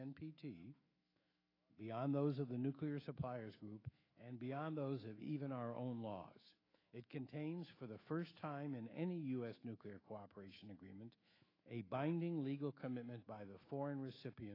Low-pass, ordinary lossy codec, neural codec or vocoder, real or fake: 5.4 kHz; AAC, 24 kbps; autoencoder, 48 kHz, 128 numbers a frame, DAC-VAE, trained on Japanese speech; fake